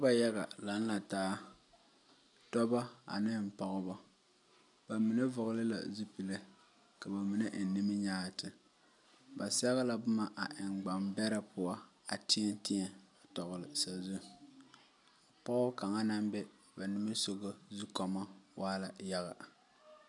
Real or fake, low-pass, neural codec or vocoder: real; 10.8 kHz; none